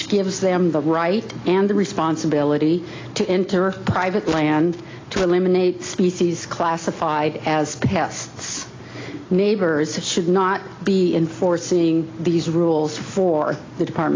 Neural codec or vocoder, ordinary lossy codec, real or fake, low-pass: none; AAC, 32 kbps; real; 7.2 kHz